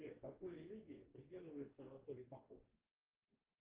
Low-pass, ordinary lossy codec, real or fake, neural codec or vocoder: 3.6 kHz; Opus, 16 kbps; fake; codec, 44.1 kHz, 2.6 kbps, DAC